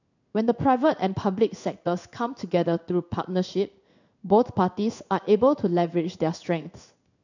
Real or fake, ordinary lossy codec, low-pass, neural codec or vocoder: fake; none; 7.2 kHz; codec, 16 kHz in and 24 kHz out, 1 kbps, XY-Tokenizer